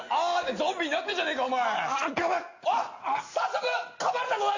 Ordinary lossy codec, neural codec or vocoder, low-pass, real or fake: AAC, 48 kbps; none; 7.2 kHz; real